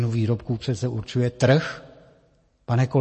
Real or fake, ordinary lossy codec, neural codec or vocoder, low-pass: real; MP3, 32 kbps; none; 10.8 kHz